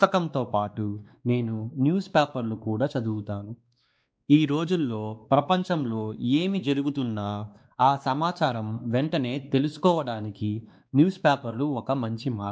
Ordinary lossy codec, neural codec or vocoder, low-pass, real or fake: none; codec, 16 kHz, 2 kbps, X-Codec, WavLM features, trained on Multilingual LibriSpeech; none; fake